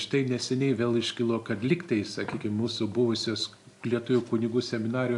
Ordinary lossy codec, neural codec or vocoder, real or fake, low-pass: AAC, 64 kbps; none; real; 10.8 kHz